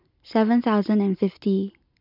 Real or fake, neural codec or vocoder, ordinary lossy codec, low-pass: real; none; MP3, 48 kbps; 5.4 kHz